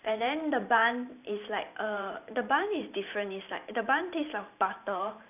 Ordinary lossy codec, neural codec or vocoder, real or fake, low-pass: none; vocoder, 44.1 kHz, 128 mel bands every 512 samples, BigVGAN v2; fake; 3.6 kHz